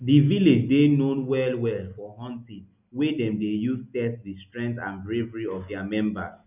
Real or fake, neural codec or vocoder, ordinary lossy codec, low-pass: real; none; none; 3.6 kHz